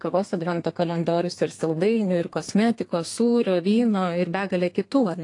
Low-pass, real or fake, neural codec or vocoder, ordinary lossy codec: 10.8 kHz; fake; codec, 32 kHz, 1.9 kbps, SNAC; AAC, 48 kbps